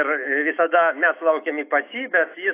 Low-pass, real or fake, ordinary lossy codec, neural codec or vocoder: 3.6 kHz; fake; AAC, 24 kbps; autoencoder, 48 kHz, 128 numbers a frame, DAC-VAE, trained on Japanese speech